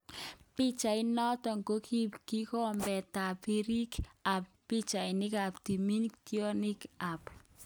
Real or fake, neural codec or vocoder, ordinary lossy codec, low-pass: real; none; none; none